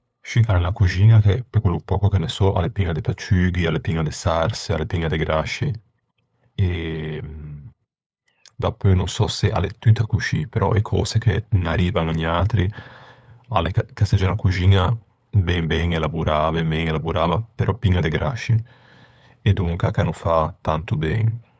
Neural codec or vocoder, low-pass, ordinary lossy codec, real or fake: codec, 16 kHz, 8 kbps, FunCodec, trained on LibriTTS, 25 frames a second; none; none; fake